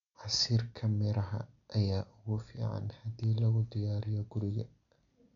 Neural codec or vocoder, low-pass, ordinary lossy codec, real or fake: none; 7.2 kHz; none; real